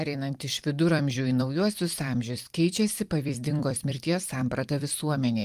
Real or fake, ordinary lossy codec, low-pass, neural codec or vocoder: fake; Opus, 32 kbps; 14.4 kHz; vocoder, 44.1 kHz, 128 mel bands every 256 samples, BigVGAN v2